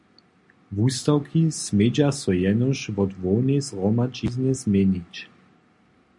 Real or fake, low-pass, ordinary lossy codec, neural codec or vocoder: real; 10.8 kHz; MP3, 64 kbps; none